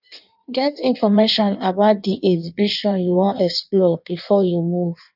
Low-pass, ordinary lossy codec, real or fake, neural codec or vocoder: 5.4 kHz; none; fake; codec, 16 kHz in and 24 kHz out, 1.1 kbps, FireRedTTS-2 codec